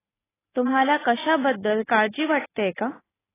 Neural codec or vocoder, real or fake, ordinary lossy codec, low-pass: none; real; AAC, 16 kbps; 3.6 kHz